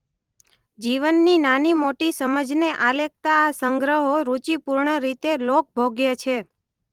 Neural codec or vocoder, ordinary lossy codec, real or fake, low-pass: vocoder, 44.1 kHz, 128 mel bands every 256 samples, BigVGAN v2; Opus, 24 kbps; fake; 19.8 kHz